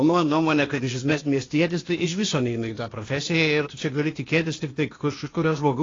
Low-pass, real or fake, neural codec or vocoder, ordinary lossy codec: 7.2 kHz; fake; codec, 16 kHz, 0.8 kbps, ZipCodec; AAC, 32 kbps